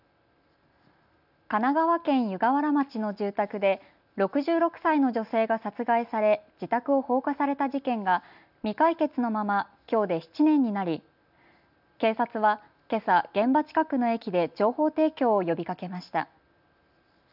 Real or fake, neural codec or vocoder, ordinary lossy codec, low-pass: real; none; none; 5.4 kHz